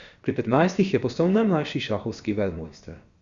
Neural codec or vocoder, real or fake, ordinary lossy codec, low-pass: codec, 16 kHz, about 1 kbps, DyCAST, with the encoder's durations; fake; Opus, 64 kbps; 7.2 kHz